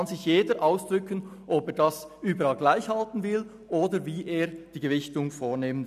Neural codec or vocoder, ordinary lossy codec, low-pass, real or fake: none; none; 14.4 kHz; real